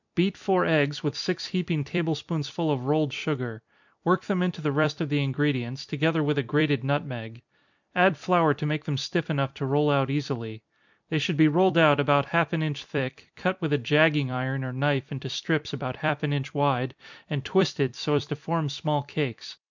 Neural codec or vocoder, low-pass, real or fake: vocoder, 44.1 kHz, 128 mel bands every 256 samples, BigVGAN v2; 7.2 kHz; fake